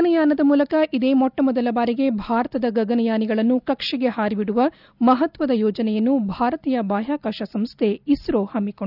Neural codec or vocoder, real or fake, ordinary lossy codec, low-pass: none; real; none; 5.4 kHz